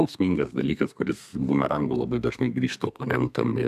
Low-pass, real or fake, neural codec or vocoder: 14.4 kHz; fake; codec, 32 kHz, 1.9 kbps, SNAC